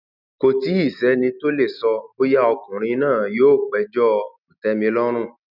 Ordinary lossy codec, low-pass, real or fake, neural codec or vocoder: none; 5.4 kHz; real; none